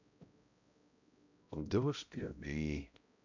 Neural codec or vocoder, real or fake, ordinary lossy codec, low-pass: codec, 16 kHz, 0.5 kbps, X-Codec, HuBERT features, trained on balanced general audio; fake; none; 7.2 kHz